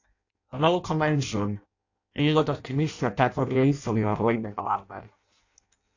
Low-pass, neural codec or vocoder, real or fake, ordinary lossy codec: 7.2 kHz; codec, 16 kHz in and 24 kHz out, 0.6 kbps, FireRedTTS-2 codec; fake; AAC, 48 kbps